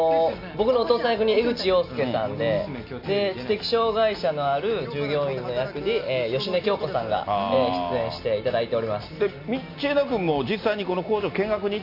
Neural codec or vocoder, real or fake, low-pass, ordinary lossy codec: none; real; 5.4 kHz; MP3, 48 kbps